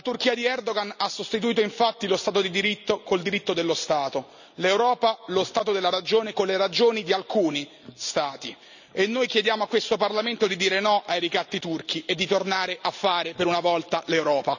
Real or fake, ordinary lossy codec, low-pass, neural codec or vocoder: real; none; 7.2 kHz; none